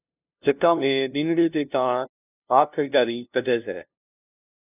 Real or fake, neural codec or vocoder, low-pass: fake; codec, 16 kHz, 0.5 kbps, FunCodec, trained on LibriTTS, 25 frames a second; 3.6 kHz